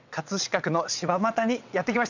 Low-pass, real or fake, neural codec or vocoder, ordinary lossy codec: 7.2 kHz; fake; vocoder, 22.05 kHz, 80 mel bands, WaveNeXt; none